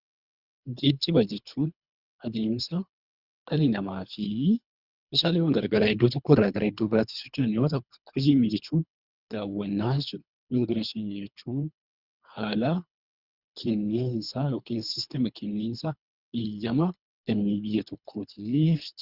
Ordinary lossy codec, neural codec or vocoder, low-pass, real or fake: Opus, 64 kbps; codec, 24 kHz, 3 kbps, HILCodec; 5.4 kHz; fake